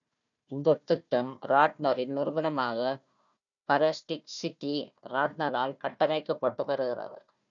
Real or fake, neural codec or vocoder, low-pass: fake; codec, 16 kHz, 1 kbps, FunCodec, trained on Chinese and English, 50 frames a second; 7.2 kHz